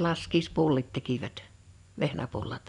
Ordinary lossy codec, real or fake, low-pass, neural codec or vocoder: none; fake; 10.8 kHz; vocoder, 24 kHz, 100 mel bands, Vocos